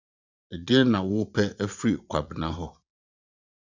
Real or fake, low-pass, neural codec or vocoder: fake; 7.2 kHz; vocoder, 44.1 kHz, 80 mel bands, Vocos